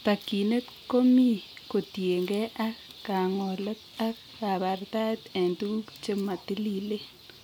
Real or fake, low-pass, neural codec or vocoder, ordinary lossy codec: real; 19.8 kHz; none; none